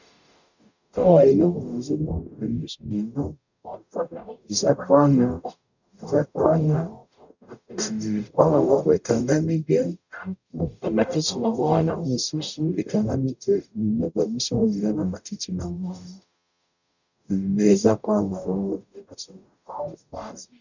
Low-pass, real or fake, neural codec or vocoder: 7.2 kHz; fake; codec, 44.1 kHz, 0.9 kbps, DAC